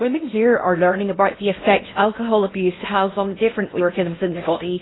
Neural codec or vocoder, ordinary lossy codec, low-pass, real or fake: codec, 16 kHz in and 24 kHz out, 0.6 kbps, FocalCodec, streaming, 4096 codes; AAC, 16 kbps; 7.2 kHz; fake